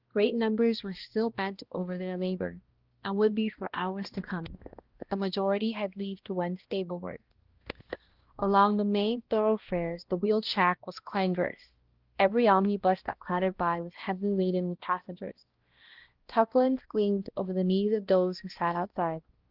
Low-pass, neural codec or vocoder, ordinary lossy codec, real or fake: 5.4 kHz; codec, 16 kHz, 1 kbps, X-Codec, HuBERT features, trained on balanced general audio; Opus, 16 kbps; fake